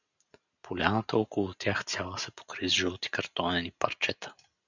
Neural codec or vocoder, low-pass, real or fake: none; 7.2 kHz; real